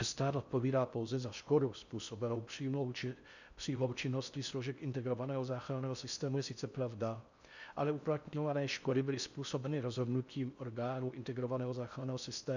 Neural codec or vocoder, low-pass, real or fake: codec, 16 kHz in and 24 kHz out, 0.6 kbps, FocalCodec, streaming, 4096 codes; 7.2 kHz; fake